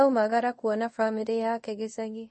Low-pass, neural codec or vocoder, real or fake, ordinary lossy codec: 10.8 kHz; codec, 24 kHz, 0.5 kbps, DualCodec; fake; MP3, 32 kbps